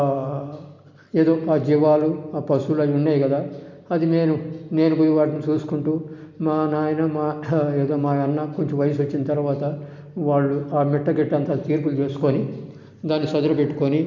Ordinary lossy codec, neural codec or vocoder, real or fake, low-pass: MP3, 64 kbps; none; real; 7.2 kHz